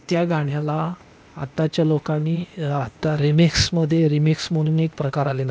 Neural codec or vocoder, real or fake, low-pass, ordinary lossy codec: codec, 16 kHz, 0.8 kbps, ZipCodec; fake; none; none